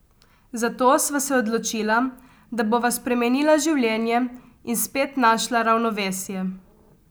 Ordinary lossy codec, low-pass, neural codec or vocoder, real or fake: none; none; none; real